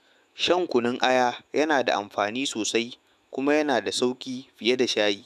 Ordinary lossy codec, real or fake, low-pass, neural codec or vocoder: none; fake; 14.4 kHz; autoencoder, 48 kHz, 128 numbers a frame, DAC-VAE, trained on Japanese speech